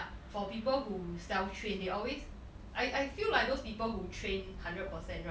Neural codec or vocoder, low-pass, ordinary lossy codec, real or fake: none; none; none; real